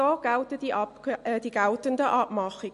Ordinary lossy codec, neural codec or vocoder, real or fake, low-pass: MP3, 48 kbps; none; real; 14.4 kHz